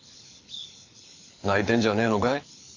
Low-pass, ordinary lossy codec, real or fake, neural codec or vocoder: 7.2 kHz; AAC, 48 kbps; fake; codec, 24 kHz, 6 kbps, HILCodec